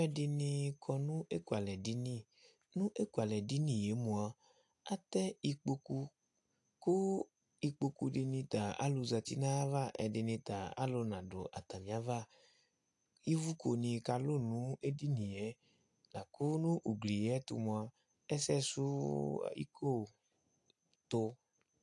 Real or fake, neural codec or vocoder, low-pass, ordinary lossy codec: real; none; 10.8 kHz; AAC, 64 kbps